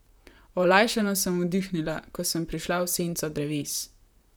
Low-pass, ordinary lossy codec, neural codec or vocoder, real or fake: none; none; vocoder, 44.1 kHz, 128 mel bands, Pupu-Vocoder; fake